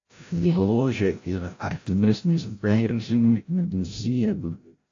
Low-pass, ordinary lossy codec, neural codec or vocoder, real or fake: 7.2 kHz; AAC, 48 kbps; codec, 16 kHz, 0.5 kbps, FreqCodec, larger model; fake